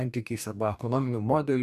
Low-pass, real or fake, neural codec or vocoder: 14.4 kHz; fake; codec, 44.1 kHz, 2.6 kbps, DAC